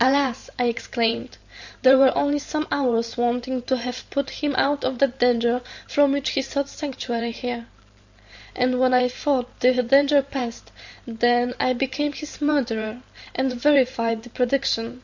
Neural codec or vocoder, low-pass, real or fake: vocoder, 44.1 kHz, 128 mel bands every 512 samples, BigVGAN v2; 7.2 kHz; fake